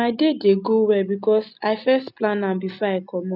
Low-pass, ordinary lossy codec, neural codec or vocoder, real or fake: 5.4 kHz; none; none; real